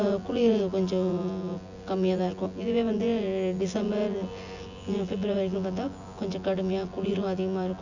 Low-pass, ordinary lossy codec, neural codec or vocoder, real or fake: 7.2 kHz; none; vocoder, 24 kHz, 100 mel bands, Vocos; fake